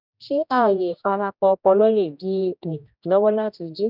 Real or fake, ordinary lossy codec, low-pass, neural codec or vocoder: fake; none; 5.4 kHz; codec, 16 kHz, 1 kbps, X-Codec, HuBERT features, trained on general audio